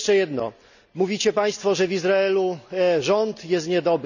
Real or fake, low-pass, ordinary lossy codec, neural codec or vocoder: real; 7.2 kHz; none; none